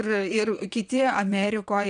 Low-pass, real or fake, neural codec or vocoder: 9.9 kHz; fake; vocoder, 22.05 kHz, 80 mel bands, WaveNeXt